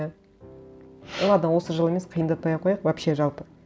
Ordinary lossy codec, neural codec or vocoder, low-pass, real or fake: none; none; none; real